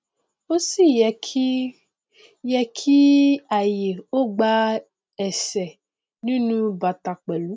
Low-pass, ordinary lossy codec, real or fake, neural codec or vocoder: none; none; real; none